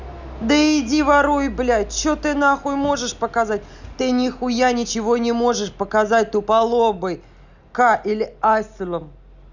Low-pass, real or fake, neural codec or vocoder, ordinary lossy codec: 7.2 kHz; real; none; none